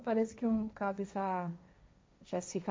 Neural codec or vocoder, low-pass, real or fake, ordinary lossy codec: codec, 16 kHz, 1.1 kbps, Voila-Tokenizer; none; fake; none